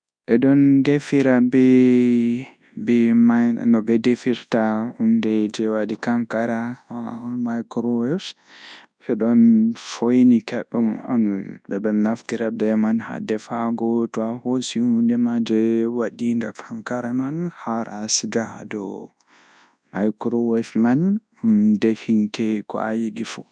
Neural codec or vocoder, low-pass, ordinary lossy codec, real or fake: codec, 24 kHz, 0.9 kbps, WavTokenizer, large speech release; 9.9 kHz; none; fake